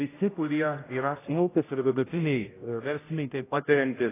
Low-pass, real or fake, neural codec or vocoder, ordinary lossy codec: 3.6 kHz; fake; codec, 16 kHz, 0.5 kbps, X-Codec, HuBERT features, trained on general audio; AAC, 16 kbps